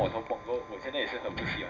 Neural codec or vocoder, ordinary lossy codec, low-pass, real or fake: none; none; 7.2 kHz; real